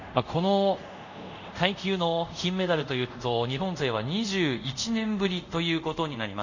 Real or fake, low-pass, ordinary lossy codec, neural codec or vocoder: fake; 7.2 kHz; none; codec, 24 kHz, 0.5 kbps, DualCodec